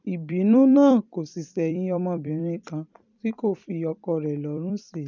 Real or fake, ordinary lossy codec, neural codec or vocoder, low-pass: fake; none; vocoder, 44.1 kHz, 128 mel bands every 256 samples, BigVGAN v2; 7.2 kHz